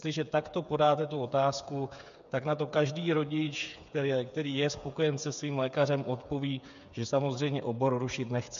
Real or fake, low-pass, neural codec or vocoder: fake; 7.2 kHz; codec, 16 kHz, 8 kbps, FreqCodec, smaller model